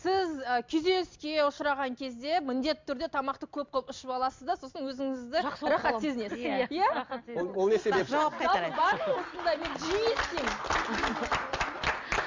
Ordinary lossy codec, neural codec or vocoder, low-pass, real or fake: MP3, 64 kbps; none; 7.2 kHz; real